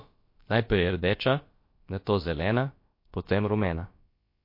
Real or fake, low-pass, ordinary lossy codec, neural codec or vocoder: fake; 5.4 kHz; MP3, 32 kbps; codec, 16 kHz, about 1 kbps, DyCAST, with the encoder's durations